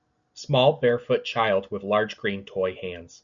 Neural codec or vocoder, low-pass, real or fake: none; 7.2 kHz; real